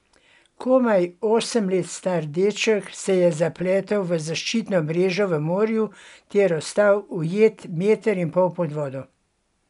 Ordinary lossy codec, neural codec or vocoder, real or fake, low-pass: none; none; real; 10.8 kHz